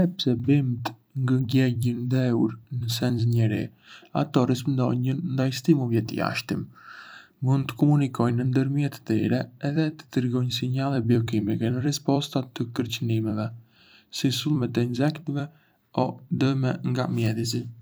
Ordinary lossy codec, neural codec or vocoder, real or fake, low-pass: none; vocoder, 44.1 kHz, 128 mel bands every 256 samples, BigVGAN v2; fake; none